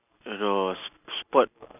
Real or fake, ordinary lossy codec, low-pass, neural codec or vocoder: real; none; 3.6 kHz; none